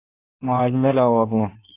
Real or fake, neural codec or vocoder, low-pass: fake; codec, 16 kHz in and 24 kHz out, 1.1 kbps, FireRedTTS-2 codec; 3.6 kHz